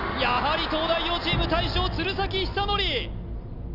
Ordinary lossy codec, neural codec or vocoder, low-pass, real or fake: none; none; 5.4 kHz; real